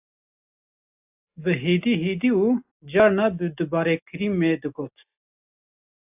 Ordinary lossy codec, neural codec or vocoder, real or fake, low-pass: AAC, 32 kbps; none; real; 3.6 kHz